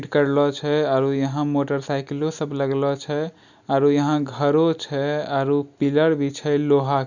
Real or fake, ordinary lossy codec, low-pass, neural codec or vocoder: real; none; 7.2 kHz; none